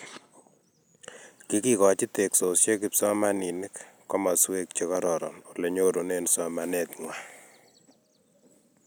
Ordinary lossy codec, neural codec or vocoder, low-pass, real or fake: none; none; none; real